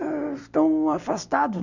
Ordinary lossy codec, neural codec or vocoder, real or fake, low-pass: none; none; real; 7.2 kHz